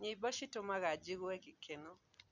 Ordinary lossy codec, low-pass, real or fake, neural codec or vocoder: none; 7.2 kHz; real; none